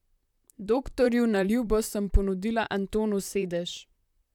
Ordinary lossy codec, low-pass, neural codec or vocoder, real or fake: none; 19.8 kHz; vocoder, 44.1 kHz, 128 mel bands, Pupu-Vocoder; fake